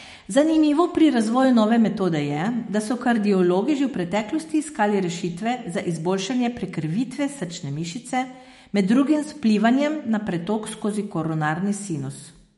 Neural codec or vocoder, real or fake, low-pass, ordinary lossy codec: autoencoder, 48 kHz, 128 numbers a frame, DAC-VAE, trained on Japanese speech; fake; 19.8 kHz; MP3, 48 kbps